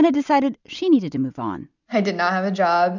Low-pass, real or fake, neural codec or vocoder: 7.2 kHz; real; none